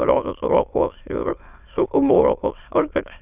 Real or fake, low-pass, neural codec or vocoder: fake; 3.6 kHz; autoencoder, 22.05 kHz, a latent of 192 numbers a frame, VITS, trained on many speakers